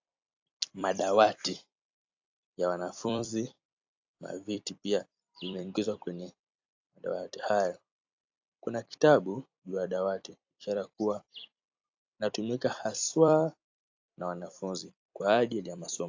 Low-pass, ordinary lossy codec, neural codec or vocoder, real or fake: 7.2 kHz; AAC, 48 kbps; vocoder, 44.1 kHz, 128 mel bands every 256 samples, BigVGAN v2; fake